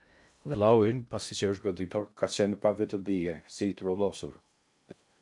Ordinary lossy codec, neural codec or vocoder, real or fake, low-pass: MP3, 96 kbps; codec, 16 kHz in and 24 kHz out, 0.6 kbps, FocalCodec, streaming, 2048 codes; fake; 10.8 kHz